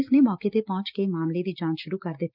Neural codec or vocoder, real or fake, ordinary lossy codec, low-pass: none; real; Opus, 24 kbps; 5.4 kHz